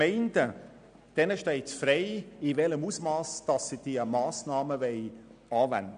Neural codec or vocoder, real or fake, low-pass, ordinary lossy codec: none; real; 9.9 kHz; none